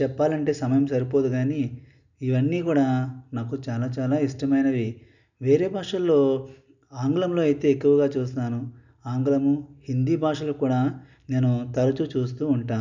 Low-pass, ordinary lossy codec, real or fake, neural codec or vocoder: 7.2 kHz; none; real; none